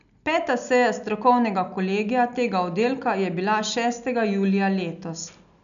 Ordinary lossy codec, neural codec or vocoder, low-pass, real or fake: none; none; 7.2 kHz; real